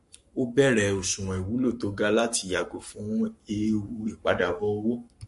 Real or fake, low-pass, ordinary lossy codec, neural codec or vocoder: fake; 14.4 kHz; MP3, 48 kbps; codec, 44.1 kHz, 7.8 kbps, Pupu-Codec